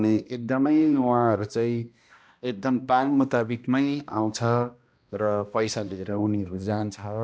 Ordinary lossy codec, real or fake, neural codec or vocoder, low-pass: none; fake; codec, 16 kHz, 1 kbps, X-Codec, HuBERT features, trained on balanced general audio; none